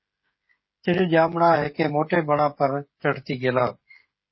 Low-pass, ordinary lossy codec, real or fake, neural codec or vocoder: 7.2 kHz; MP3, 24 kbps; fake; codec, 16 kHz, 16 kbps, FreqCodec, smaller model